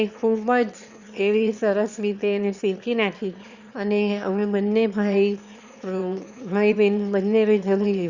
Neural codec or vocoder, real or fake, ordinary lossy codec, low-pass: autoencoder, 22.05 kHz, a latent of 192 numbers a frame, VITS, trained on one speaker; fake; Opus, 64 kbps; 7.2 kHz